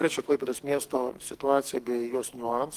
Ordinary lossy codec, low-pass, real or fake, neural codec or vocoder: Opus, 24 kbps; 14.4 kHz; fake; codec, 44.1 kHz, 2.6 kbps, SNAC